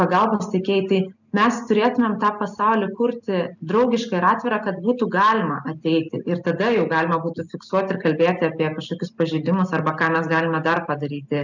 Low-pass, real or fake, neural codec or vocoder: 7.2 kHz; real; none